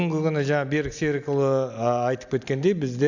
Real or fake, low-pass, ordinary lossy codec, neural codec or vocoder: real; 7.2 kHz; none; none